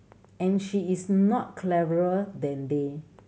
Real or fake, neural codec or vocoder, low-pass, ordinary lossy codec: real; none; none; none